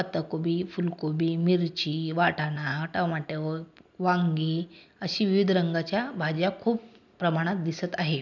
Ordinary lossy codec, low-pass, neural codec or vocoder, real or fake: none; 7.2 kHz; none; real